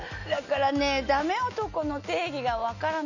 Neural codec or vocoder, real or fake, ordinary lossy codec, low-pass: none; real; none; 7.2 kHz